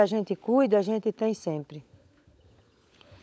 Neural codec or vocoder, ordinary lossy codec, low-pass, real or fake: codec, 16 kHz, 16 kbps, FunCodec, trained on LibriTTS, 50 frames a second; none; none; fake